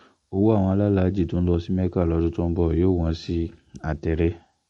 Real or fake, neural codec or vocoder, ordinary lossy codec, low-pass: real; none; MP3, 48 kbps; 19.8 kHz